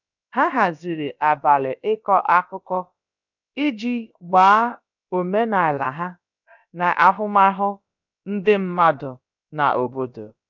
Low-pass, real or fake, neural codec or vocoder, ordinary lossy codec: 7.2 kHz; fake; codec, 16 kHz, about 1 kbps, DyCAST, with the encoder's durations; none